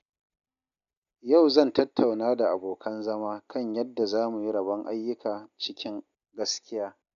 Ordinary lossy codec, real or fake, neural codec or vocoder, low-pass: none; real; none; 7.2 kHz